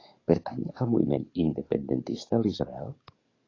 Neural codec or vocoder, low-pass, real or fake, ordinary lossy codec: codec, 44.1 kHz, 7.8 kbps, DAC; 7.2 kHz; fake; AAC, 32 kbps